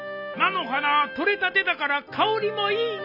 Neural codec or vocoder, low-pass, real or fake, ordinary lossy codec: none; 5.4 kHz; real; none